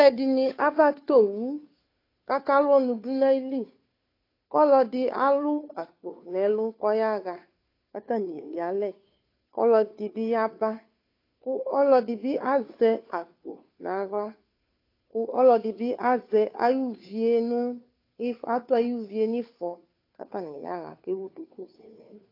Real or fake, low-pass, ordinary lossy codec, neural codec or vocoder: fake; 5.4 kHz; AAC, 32 kbps; codec, 16 kHz, 2 kbps, FunCodec, trained on Chinese and English, 25 frames a second